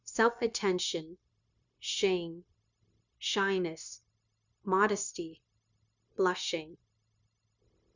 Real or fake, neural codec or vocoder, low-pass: fake; codec, 16 kHz, 0.9 kbps, LongCat-Audio-Codec; 7.2 kHz